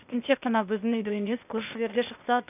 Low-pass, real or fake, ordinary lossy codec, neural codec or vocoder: 3.6 kHz; fake; none; codec, 16 kHz, 0.8 kbps, ZipCodec